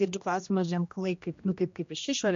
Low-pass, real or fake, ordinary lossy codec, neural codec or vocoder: 7.2 kHz; fake; MP3, 48 kbps; codec, 16 kHz, 1 kbps, X-Codec, HuBERT features, trained on general audio